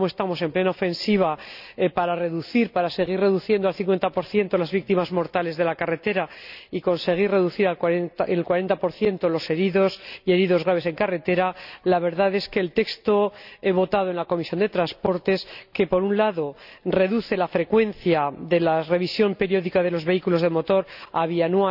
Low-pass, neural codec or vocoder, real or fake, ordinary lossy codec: 5.4 kHz; none; real; none